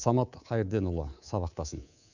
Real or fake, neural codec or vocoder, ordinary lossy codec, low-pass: fake; codec, 24 kHz, 3.1 kbps, DualCodec; none; 7.2 kHz